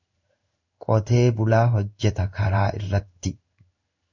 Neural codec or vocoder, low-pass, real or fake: codec, 16 kHz in and 24 kHz out, 1 kbps, XY-Tokenizer; 7.2 kHz; fake